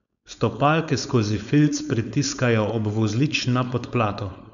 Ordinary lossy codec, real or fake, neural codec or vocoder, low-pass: none; fake; codec, 16 kHz, 4.8 kbps, FACodec; 7.2 kHz